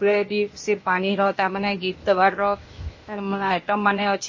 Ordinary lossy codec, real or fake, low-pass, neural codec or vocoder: MP3, 32 kbps; fake; 7.2 kHz; codec, 16 kHz, 0.8 kbps, ZipCodec